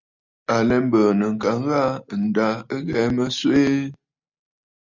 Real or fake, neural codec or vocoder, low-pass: real; none; 7.2 kHz